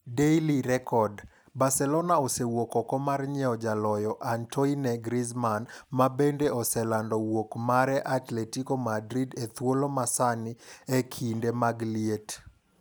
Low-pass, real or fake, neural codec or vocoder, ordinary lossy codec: none; real; none; none